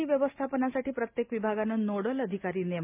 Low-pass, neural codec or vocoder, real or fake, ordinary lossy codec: 3.6 kHz; none; real; MP3, 32 kbps